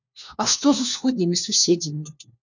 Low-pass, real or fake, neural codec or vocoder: 7.2 kHz; fake; codec, 16 kHz, 1 kbps, FunCodec, trained on LibriTTS, 50 frames a second